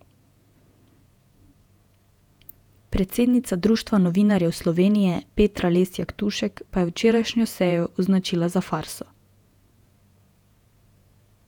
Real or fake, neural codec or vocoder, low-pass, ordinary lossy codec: fake; vocoder, 48 kHz, 128 mel bands, Vocos; 19.8 kHz; none